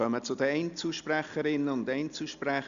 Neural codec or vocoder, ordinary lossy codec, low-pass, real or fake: none; Opus, 64 kbps; 7.2 kHz; real